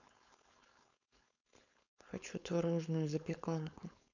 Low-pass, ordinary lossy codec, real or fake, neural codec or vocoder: 7.2 kHz; none; fake; codec, 16 kHz, 4.8 kbps, FACodec